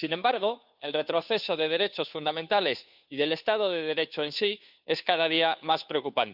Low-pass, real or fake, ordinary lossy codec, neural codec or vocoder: 5.4 kHz; fake; none; codec, 16 kHz, 2 kbps, FunCodec, trained on Chinese and English, 25 frames a second